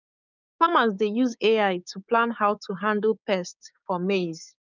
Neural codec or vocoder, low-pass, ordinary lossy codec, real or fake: codec, 16 kHz, 6 kbps, DAC; 7.2 kHz; none; fake